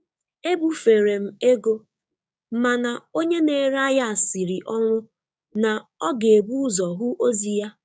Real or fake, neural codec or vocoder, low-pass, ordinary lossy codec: fake; codec, 16 kHz, 6 kbps, DAC; none; none